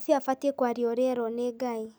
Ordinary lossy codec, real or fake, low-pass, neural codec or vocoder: none; real; none; none